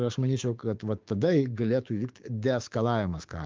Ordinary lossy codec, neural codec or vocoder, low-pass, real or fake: Opus, 32 kbps; codec, 16 kHz, 6 kbps, DAC; 7.2 kHz; fake